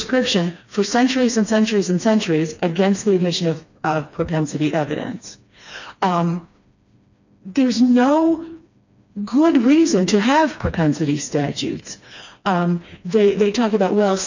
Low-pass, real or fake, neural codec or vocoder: 7.2 kHz; fake; codec, 16 kHz, 2 kbps, FreqCodec, smaller model